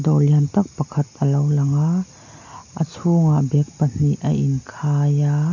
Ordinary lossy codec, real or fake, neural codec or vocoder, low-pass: none; real; none; 7.2 kHz